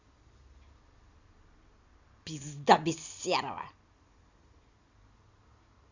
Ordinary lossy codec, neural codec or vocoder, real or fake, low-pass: Opus, 64 kbps; none; real; 7.2 kHz